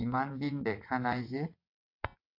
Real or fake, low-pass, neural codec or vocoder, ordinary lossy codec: fake; 5.4 kHz; vocoder, 22.05 kHz, 80 mel bands, WaveNeXt; AAC, 32 kbps